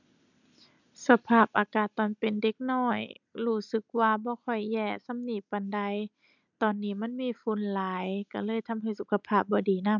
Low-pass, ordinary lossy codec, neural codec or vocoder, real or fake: 7.2 kHz; none; none; real